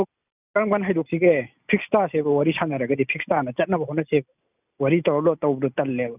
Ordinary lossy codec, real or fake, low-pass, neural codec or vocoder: none; real; 3.6 kHz; none